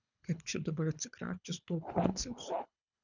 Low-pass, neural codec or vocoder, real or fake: 7.2 kHz; codec, 24 kHz, 3 kbps, HILCodec; fake